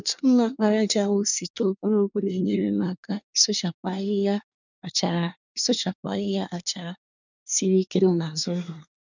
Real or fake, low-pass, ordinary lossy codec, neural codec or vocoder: fake; 7.2 kHz; none; codec, 24 kHz, 1 kbps, SNAC